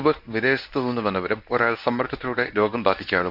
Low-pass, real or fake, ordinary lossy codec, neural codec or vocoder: 5.4 kHz; fake; none; codec, 24 kHz, 0.9 kbps, WavTokenizer, medium speech release version 1